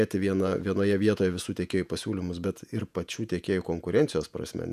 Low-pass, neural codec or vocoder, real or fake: 14.4 kHz; vocoder, 48 kHz, 128 mel bands, Vocos; fake